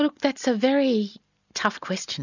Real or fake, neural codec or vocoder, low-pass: real; none; 7.2 kHz